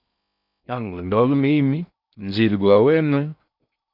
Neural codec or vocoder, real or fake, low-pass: codec, 16 kHz in and 24 kHz out, 0.6 kbps, FocalCodec, streaming, 4096 codes; fake; 5.4 kHz